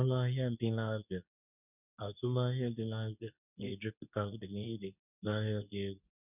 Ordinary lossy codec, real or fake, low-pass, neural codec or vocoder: none; fake; 3.6 kHz; codec, 24 kHz, 0.9 kbps, WavTokenizer, medium speech release version 2